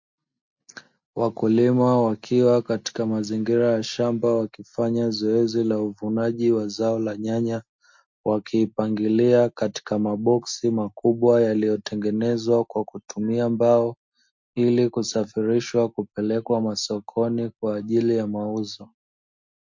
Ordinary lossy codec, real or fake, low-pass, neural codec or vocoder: MP3, 48 kbps; real; 7.2 kHz; none